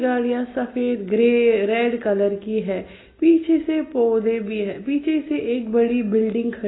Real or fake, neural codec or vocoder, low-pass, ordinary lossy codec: real; none; 7.2 kHz; AAC, 16 kbps